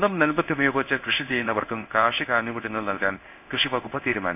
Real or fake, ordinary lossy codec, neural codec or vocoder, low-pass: fake; none; codec, 16 kHz in and 24 kHz out, 1 kbps, XY-Tokenizer; 3.6 kHz